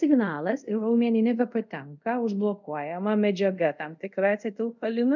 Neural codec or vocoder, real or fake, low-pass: codec, 24 kHz, 0.5 kbps, DualCodec; fake; 7.2 kHz